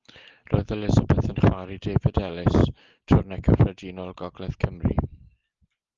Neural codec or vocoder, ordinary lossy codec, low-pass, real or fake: none; Opus, 32 kbps; 7.2 kHz; real